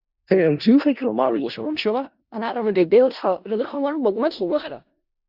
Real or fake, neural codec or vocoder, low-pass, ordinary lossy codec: fake; codec, 16 kHz in and 24 kHz out, 0.4 kbps, LongCat-Audio-Codec, four codebook decoder; 5.4 kHz; Opus, 64 kbps